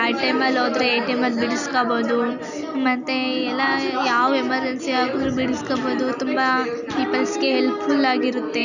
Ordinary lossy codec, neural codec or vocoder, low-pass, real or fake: none; none; 7.2 kHz; real